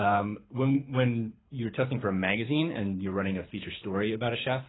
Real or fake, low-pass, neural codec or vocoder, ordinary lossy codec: fake; 7.2 kHz; codec, 24 kHz, 6 kbps, HILCodec; AAC, 16 kbps